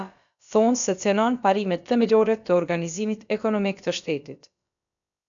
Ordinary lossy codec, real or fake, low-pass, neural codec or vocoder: MP3, 96 kbps; fake; 7.2 kHz; codec, 16 kHz, about 1 kbps, DyCAST, with the encoder's durations